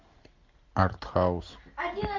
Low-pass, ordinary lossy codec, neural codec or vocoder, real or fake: 7.2 kHz; MP3, 48 kbps; none; real